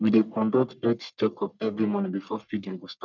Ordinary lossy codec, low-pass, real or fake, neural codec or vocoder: none; 7.2 kHz; fake; codec, 44.1 kHz, 1.7 kbps, Pupu-Codec